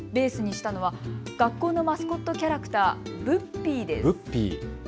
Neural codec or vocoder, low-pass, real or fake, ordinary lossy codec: none; none; real; none